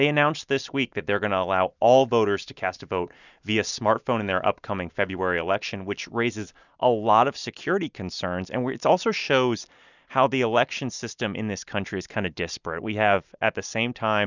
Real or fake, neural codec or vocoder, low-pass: real; none; 7.2 kHz